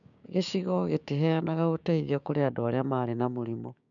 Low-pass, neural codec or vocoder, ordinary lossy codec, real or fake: 7.2 kHz; codec, 16 kHz, 6 kbps, DAC; none; fake